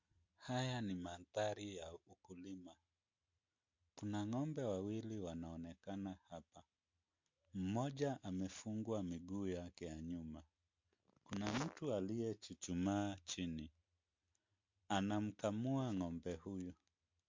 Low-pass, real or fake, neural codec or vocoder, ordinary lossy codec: 7.2 kHz; real; none; MP3, 48 kbps